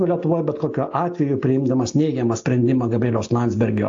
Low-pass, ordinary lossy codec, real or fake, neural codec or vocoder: 7.2 kHz; AAC, 48 kbps; real; none